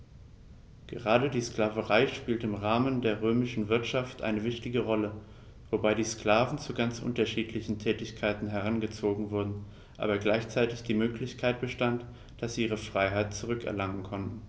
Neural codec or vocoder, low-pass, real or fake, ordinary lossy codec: none; none; real; none